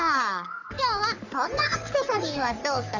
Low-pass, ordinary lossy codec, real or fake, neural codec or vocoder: 7.2 kHz; none; fake; codec, 44.1 kHz, 3.4 kbps, Pupu-Codec